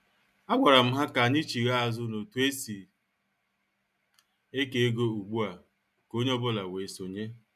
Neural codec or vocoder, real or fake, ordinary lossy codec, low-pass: none; real; none; 14.4 kHz